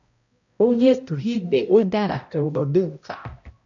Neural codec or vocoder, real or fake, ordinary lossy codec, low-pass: codec, 16 kHz, 0.5 kbps, X-Codec, HuBERT features, trained on balanced general audio; fake; MP3, 64 kbps; 7.2 kHz